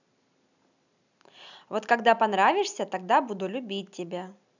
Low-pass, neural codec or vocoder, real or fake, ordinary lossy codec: 7.2 kHz; none; real; none